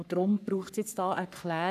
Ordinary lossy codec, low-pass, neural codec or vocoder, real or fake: none; 14.4 kHz; codec, 44.1 kHz, 3.4 kbps, Pupu-Codec; fake